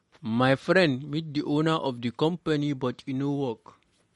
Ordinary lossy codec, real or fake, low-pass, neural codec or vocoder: MP3, 48 kbps; real; 19.8 kHz; none